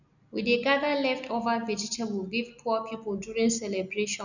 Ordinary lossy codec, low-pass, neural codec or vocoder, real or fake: none; 7.2 kHz; none; real